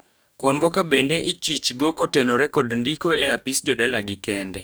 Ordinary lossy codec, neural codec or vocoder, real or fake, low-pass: none; codec, 44.1 kHz, 2.6 kbps, DAC; fake; none